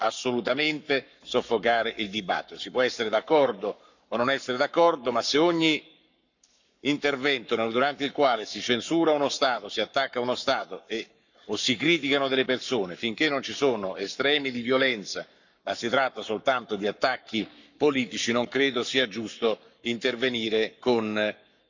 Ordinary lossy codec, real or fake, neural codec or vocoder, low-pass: none; fake; codec, 44.1 kHz, 7.8 kbps, Pupu-Codec; 7.2 kHz